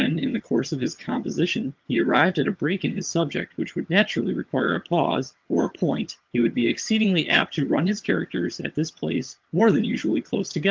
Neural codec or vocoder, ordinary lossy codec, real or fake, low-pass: vocoder, 22.05 kHz, 80 mel bands, HiFi-GAN; Opus, 32 kbps; fake; 7.2 kHz